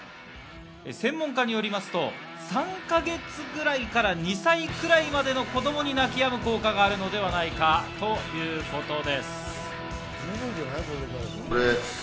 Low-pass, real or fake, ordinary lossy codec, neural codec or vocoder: none; real; none; none